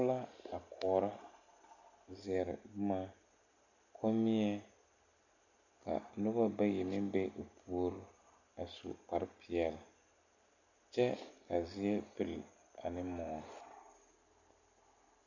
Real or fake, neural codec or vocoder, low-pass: real; none; 7.2 kHz